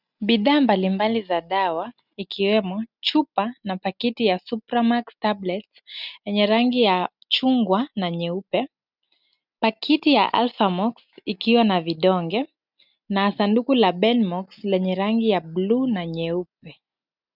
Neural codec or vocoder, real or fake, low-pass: none; real; 5.4 kHz